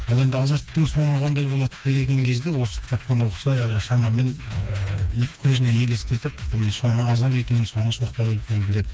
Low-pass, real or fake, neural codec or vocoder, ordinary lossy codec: none; fake; codec, 16 kHz, 2 kbps, FreqCodec, smaller model; none